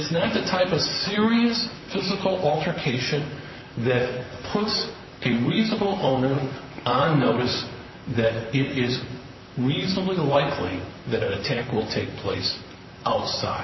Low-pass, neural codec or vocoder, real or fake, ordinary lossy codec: 7.2 kHz; vocoder, 44.1 kHz, 128 mel bands, Pupu-Vocoder; fake; MP3, 24 kbps